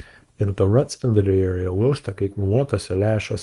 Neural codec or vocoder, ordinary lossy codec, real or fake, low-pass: codec, 24 kHz, 0.9 kbps, WavTokenizer, small release; Opus, 32 kbps; fake; 10.8 kHz